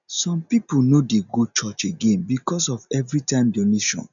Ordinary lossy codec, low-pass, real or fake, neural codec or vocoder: none; 7.2 kHz; real; none